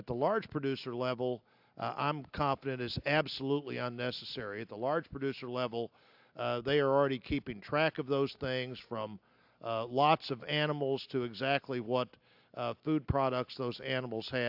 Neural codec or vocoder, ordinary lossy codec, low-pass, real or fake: none; AAC, 48 kbps; 5.4 kHz; real